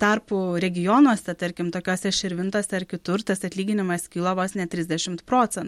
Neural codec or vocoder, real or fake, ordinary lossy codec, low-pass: none; real; MP3, 64 kbps; 19.8 kHz